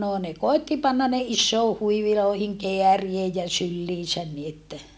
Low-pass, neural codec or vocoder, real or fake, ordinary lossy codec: none; none; real; none